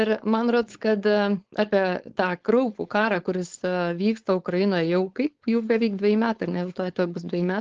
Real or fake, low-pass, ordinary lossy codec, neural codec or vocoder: fake; 7.2 kHz; Opus, 16 kbps; codec, 16 kHz, 4.8 kbps, FACodec